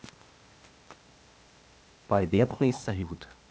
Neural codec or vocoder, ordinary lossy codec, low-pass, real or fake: codec, 16 kHz, 0.8 kbps, ZipCodec; none; none; fake